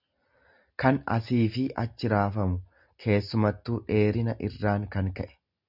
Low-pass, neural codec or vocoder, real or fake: 5.4 kHz; none; real